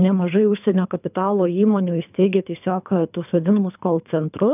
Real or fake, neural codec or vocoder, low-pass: fake; codec, 24 kHz, 3 kbps, HILCodec; 3.6 kHz